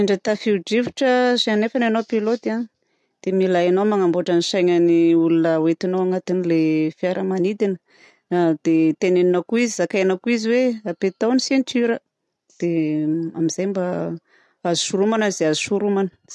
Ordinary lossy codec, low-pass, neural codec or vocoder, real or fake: none; none; none; real